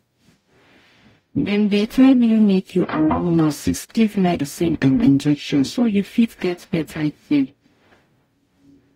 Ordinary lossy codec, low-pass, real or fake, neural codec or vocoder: AAC, 48 kbps; 19.8 kHz; fake; codec, 44.1 kHz, 0.9 kbps, DAC